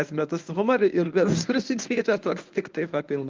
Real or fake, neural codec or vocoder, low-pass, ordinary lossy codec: fake; codec, 24 kHz, 0.9 kbps, WavTokenizer, medium speech release version 1; 7.2 kHz; Opus, 24 kbps